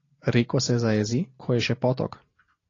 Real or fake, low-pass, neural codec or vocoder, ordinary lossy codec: real; 7.2 kHz; none; AAC, 32 kbps